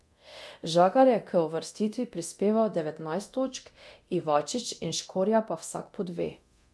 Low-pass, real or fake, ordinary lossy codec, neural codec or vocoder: none; fake; none; codec, 24 kHz, 0.9 kbps, DualCodec